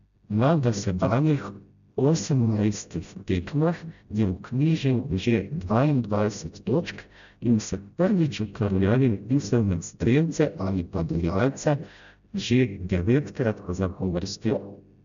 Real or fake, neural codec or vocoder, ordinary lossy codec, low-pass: fake; codec, 16 kHz, 0.5 kbps, FreqCodec, smaller model; none; 7.2 kHz